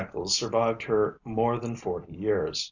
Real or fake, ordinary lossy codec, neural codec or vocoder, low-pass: real; Opus, 64 kbps; none; 7.2 kHz